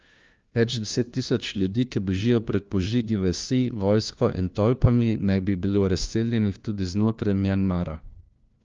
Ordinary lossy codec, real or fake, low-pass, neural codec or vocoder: Opus, 24 kbps; fake; 7.2 kHz; codec, 16 kHz, 1 kbps, FunCodec, trained on LibriTTS, 50 frames a second